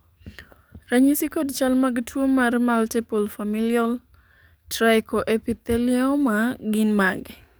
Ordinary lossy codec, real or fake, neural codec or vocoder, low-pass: none; fake; codec, 44.1 kHz, 7.8 kbps, DAC; none